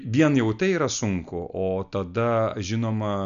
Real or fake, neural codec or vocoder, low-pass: real; none; 7.2 kHz